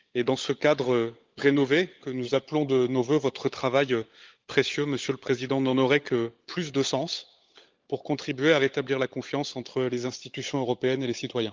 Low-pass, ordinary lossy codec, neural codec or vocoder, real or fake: 7.2 kHz; Opus, 32 kbps; codec, 16 kHz, 16 kbps, FunCodec, trained on LibriTTS, 50 frames a second; fake